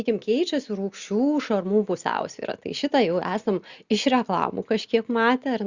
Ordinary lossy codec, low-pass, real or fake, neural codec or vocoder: Opus, 64 kbps; 7.2 kHz; real; none